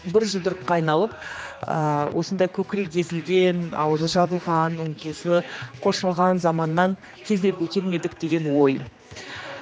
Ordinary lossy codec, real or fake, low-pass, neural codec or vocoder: none; fake; none; codec, 16 kHz, 2 kbps, X-Codec, HuBERT features, trained on general audio